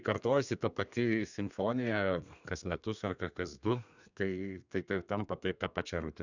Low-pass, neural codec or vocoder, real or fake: 7.2 kHz; codec, 32 kHz, 1.9 kbps, SNAC; fake